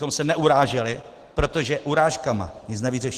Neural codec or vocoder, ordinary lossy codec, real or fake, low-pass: none; Opus, 16 kbps; real; 14.4 kHz